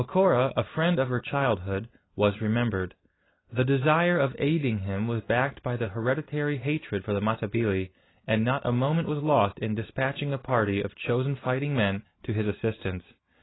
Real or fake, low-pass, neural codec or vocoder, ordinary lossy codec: real; 7.2 kHz; none; AAC, 16 kbps